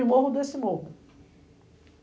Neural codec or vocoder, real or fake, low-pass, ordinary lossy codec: none; real; none; none